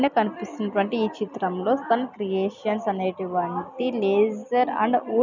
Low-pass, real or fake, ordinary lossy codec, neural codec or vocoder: 7.2 kHz; real; none; none